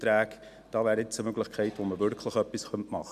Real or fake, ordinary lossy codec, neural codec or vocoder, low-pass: real; none; none; 14.4 kHz